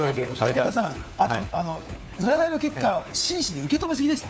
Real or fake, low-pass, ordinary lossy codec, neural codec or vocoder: fake; none; none; codec, 16 kHz, 4 kbps, FunCodec, trained on LibriTTS, 50 frames a second